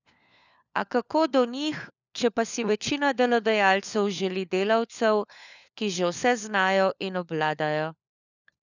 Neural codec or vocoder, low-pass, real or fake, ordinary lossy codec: codec, 16 kHz, 4 kbps, FunCodec, trained on LibriTTS, 50 frames a second; 7.2 kHz; fake; none